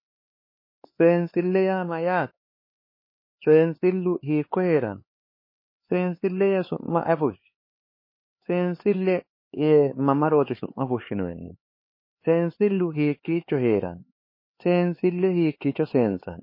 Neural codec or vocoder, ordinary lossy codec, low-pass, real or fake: codec, 16 kHz, 4 kbps, X-Codec, HuBERT features, trained on LibriSpeech; MP3, 24 kbps; 5.4 kHz; fake